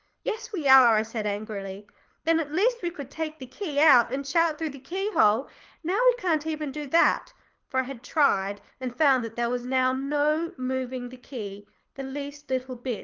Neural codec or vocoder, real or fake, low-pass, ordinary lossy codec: codec, 24 kHz, 6 kbps, HILCodec; fake; 7.2 kHz; Opus, 32 kbps